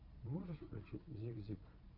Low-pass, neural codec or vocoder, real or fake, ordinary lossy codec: 5.4 kHz; vocoder, 22.05 kHz, 80 mel bands, Vocos; fake; AAC, 48 kbps